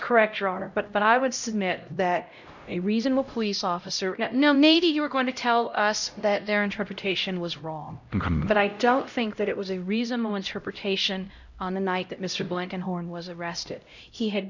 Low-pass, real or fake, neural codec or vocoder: 7.2 kHz; fake; codec, 16 kHz, 0.5 kbps, X-Codec, HuBERT features, trained on LibriSpeech